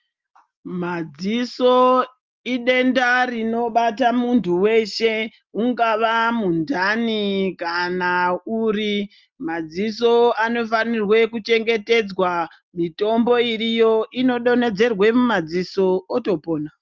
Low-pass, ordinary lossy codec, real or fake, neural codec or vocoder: 7.2 kHz; Opus, 32 kbps; real; none